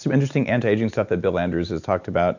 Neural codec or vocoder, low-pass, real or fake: none; 7.2 kHz; real